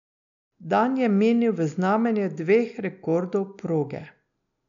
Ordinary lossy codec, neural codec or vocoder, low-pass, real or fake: none; none; 7.2 kHz; real